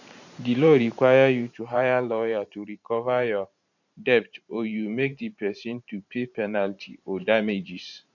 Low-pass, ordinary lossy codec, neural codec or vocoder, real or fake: 7.2 kHz; none; vocoder, 44.1 kHz, 128 mel bands every 512 samples, BigVGAN v2; fake